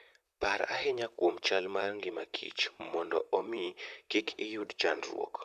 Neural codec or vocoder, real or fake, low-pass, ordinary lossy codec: vocoder, 44.1 kHz, 128 mel bands, Pupu-Vocoder; fake; 14.4 kHz; none